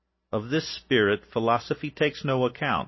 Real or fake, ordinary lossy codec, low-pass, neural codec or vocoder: real; MP3, 24 kbps; 7.2 kHz; none